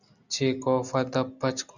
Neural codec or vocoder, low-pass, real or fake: none; 7.2 kHz; real